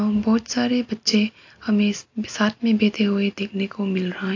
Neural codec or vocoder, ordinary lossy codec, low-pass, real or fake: none; AAC, 48 kbps; 7.2 kHz; real